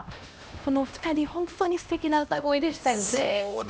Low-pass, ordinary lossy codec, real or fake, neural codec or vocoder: none; none; fake; codec, 16 kHz, 1 kbps, X-Codec, HuBERT features, trained on LibriSpeech